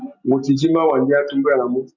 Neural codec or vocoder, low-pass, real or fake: none; 7.2 kHz; real